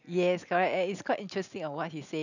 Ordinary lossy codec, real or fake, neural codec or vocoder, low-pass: none; real; none; 7.2 kHz